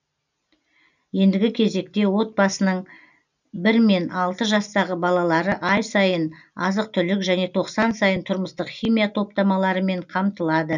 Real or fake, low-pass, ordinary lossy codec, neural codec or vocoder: real; 7.2 kHz; none; none